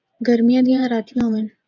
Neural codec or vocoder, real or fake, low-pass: vocoder, 24 kHz, 100 mel bands, Vocos; fake; 7.2 kHz